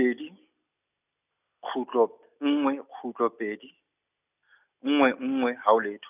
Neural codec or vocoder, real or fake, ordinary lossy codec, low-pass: none; real; none; 3.6 kHz